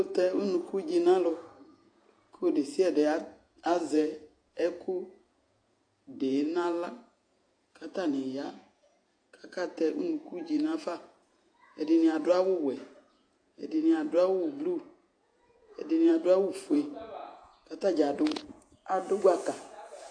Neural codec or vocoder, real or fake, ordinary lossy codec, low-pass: vocoder, 48 kHz, 128 mel bands, Vocos; fake; AAC, 48 kbps; 9.9 kHz